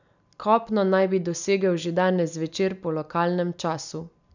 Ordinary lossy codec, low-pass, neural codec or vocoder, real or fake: none; 7.2 kHz; none; real